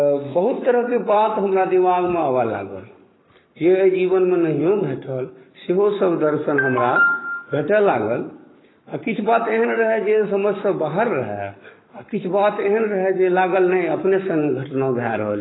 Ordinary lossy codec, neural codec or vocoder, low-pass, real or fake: AAC, 16 kbps; codec, 44.1 kHz, 7.8 kbps, Pupu-Codec; 7.2 kHz; fake